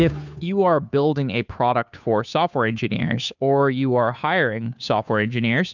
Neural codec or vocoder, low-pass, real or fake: codec, 16 kHz, 2 kbps, FunCodec, trained on Chinese and English, 25 frames a second; 7.2 kHz; fake